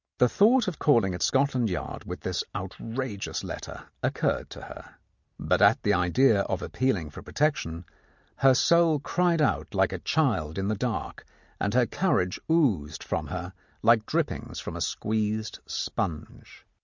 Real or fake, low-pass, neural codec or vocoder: real; 7.2 kHz; none